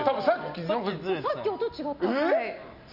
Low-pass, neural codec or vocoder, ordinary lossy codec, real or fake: 5.4 kHz; none; none; real